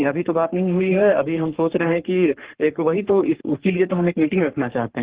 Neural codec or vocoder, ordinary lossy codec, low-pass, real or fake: codec, 44.1 kHz, 3.4 kbps, Pupu-Codec; Opus, 16 kbps; 3.6 kHz; fake